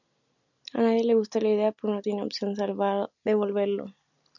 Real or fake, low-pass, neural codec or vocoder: real; 7.2 kHz; none